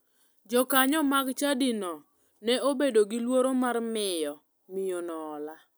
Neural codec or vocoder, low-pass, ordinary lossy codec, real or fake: none; none; none; real